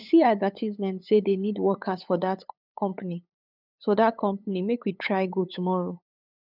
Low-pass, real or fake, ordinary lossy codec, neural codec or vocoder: 5.4 kHz; fake; none; codec, 16 kHz, 8 kbps, FunCodec, trained on LibriTTS, 25 frames a second